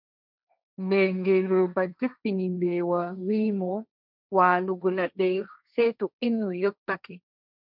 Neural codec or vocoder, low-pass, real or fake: codec, 16 kHz, 1.1 kbps, Voila-Tokenizer; 5.4 kHz; fake